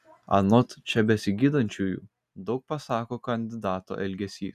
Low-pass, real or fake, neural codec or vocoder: 14.4 kHz; real; none